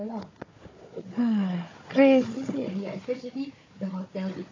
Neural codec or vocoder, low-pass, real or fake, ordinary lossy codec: codec, 16 kHz, 4 kbps, FunCodec, trained on Chinese and English, 50 frames a second; 7.2 kHz; fake; AAC, 32 kbps